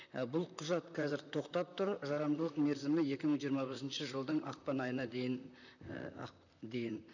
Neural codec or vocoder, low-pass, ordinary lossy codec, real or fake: vocoder, 44.1 kHz, 128 mel bands, Pupu-Vocoder; 7.2 kHz; none; fake